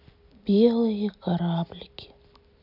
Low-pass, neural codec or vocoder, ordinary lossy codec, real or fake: 5.4 kHz; none; none; real